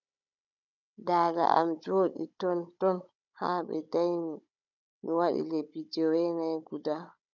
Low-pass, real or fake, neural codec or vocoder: 7.2 kHz; fake; codec, 16 kHz, 16 kbps, FunCodec, trained on Chinese and English, 50 frames a second